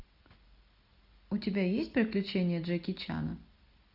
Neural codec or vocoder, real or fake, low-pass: none; real; 5.4 kHz